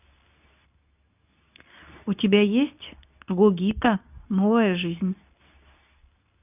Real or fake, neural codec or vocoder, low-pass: fake; codec, 24 kHz, 0.9 kbps, WavTokenizer, medium speech release version 2; 3.6 kHz